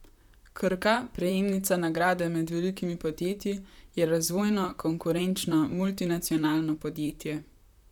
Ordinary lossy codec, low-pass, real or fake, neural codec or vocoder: none; 19.8 kHz; fake; vocoder, 44.1 kHz, 128 mel bands, Pupu-Vocoder